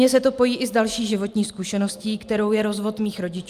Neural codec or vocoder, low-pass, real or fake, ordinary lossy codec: none; 14.4 kHz; real; Opus, 32 kbps